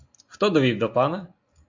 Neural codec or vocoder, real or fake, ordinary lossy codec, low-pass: none; real; AAC, 48 kbps; 7.2 kHz